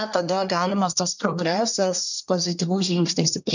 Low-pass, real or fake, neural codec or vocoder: 7.2 kHz; fake; codec, 24 kHz, 1 kbps, SNAC